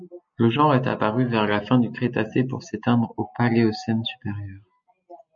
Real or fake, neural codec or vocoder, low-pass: real; none; 7.2 kHz